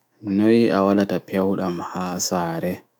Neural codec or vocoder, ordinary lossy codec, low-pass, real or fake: autoencoder, 48 kHz, 128 numbers a frame, DAC-VAE, trained on Japanese speech; none; none; fake